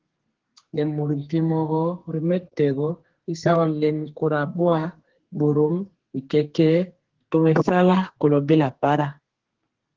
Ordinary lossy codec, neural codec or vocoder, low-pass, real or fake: Opus, 16 kbps; codec, 32 kHz, 1.9 kbps, SNAC; 7.2 kHz; fake